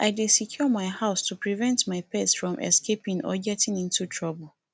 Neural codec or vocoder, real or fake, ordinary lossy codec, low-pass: none; real; none; none